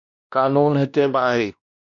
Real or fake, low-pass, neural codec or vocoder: fake; 7.2 kHz; codec, 16 kHz, 1 kbps, X-Codec, WavLM features, trained on Multilingual LibriSpeech